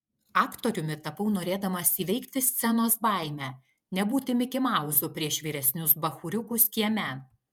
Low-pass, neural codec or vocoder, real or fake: 19.8 kHz; vocoder, 48 kHz, 128 mel bands, Vocos; fake